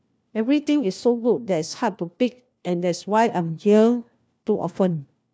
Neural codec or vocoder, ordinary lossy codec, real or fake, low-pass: codec, 16 kHz, 1 kbps, FunCodec, trained on LibriTTS, 50 frames a second; none; fake; none